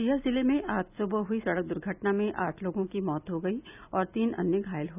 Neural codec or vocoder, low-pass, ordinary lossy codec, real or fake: none; 3.6 kHz; none; real